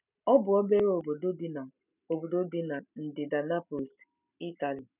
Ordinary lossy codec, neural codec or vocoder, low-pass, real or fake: none; none; 3.6 kHz; real